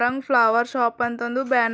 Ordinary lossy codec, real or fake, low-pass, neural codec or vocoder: none; real; none; none